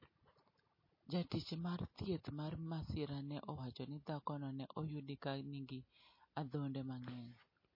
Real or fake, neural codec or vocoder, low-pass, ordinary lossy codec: real; none; 5.4 kHz; MP3, 24 kbps